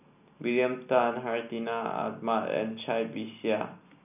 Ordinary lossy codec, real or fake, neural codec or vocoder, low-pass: none; real; none; 3.6 kHz